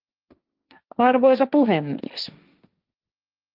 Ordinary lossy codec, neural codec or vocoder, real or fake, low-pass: Opus, 32 kbps; codec, 16 kHz, 1.1 kbps, Voila-Tokenizer; fake; 5.4 kHz